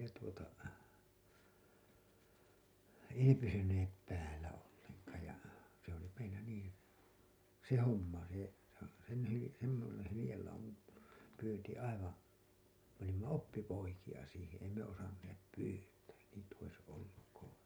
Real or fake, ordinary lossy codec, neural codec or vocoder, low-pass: real; none; none; none